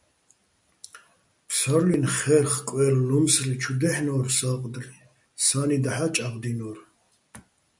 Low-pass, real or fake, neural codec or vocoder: 10.8 kHz; real; none